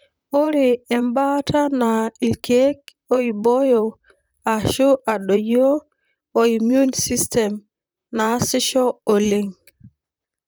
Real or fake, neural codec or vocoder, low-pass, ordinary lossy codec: fake; vocoder, 44.1 kHz, 128 mel bands, Pupu-Vocoder; none; none